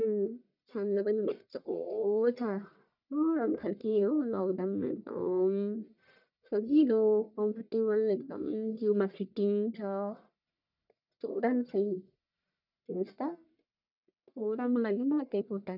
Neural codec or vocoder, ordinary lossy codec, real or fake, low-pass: codec, 44.1 kHz, 1.7 kbps, Pupu-Codec; none; fake; 5.4 kHz